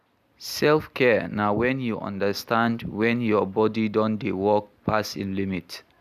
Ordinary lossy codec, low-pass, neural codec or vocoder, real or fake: none; 14.4 kHz; none; real